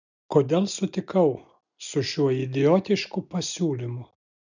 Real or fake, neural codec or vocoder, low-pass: real; none; 7.2 kHz